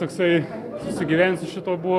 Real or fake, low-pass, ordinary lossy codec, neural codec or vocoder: real; 14.4 kHz; AAC, 64 kbps; none